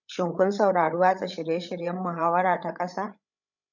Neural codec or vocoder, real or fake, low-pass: codec, 16 kHz, 8 kbps, FreqCodec, larger model; fake; 7.2 kHz